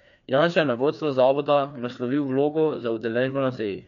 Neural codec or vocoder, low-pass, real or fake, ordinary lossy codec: codec, 16 kHz, 2 kbps, FreqCodec, larger model; 7.2 kHz; fake; none